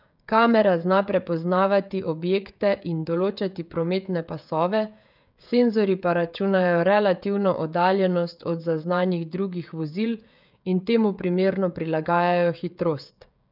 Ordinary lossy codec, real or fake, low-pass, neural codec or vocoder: none; fake; 5.4 kHz; codec, 16 kHz, 16 kbps, FreqCodec, smaller model